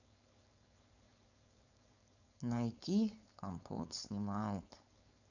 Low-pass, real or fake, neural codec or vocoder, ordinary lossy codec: 7.2 kHz; fake; codec, 16 kHz, 4.8 kbps, FACodec; none